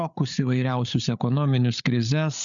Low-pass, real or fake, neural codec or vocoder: 7.2 kHz; fake; codec, 16 kHz, 8 kbps, FreqCodec, larger model